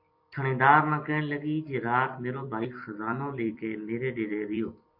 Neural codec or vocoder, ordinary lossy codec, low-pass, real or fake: vocoder, 44.1 kHz, 128 mel bands every 256 samples, BigVGAN v2; MP3, 32 kbps; 5.4 kHz; fake